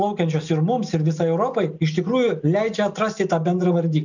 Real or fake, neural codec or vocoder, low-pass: real; none; 7.2 kHz